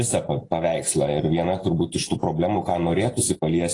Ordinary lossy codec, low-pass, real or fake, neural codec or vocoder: AAC, 32 kbps; 10.8 kHz; real; none